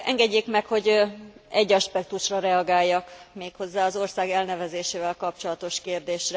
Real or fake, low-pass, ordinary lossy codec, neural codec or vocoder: real; none; none; none